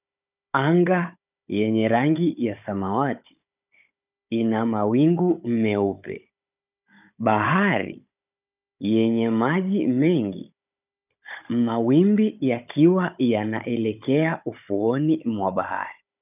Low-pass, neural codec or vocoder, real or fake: 3.6 kHz; codec, 16 kHz, 16 kbps, FunCodec, trained on Chinese and English, 50 frames a second; fake